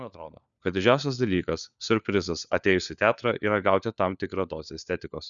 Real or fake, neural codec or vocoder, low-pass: fake; codec, 16 kHz, 4 kbps, FunCodec, trained on LibriTTS, 50 frames a second; 7.2 kHz